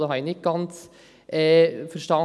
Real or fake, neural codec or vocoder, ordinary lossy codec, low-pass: real; none; none; none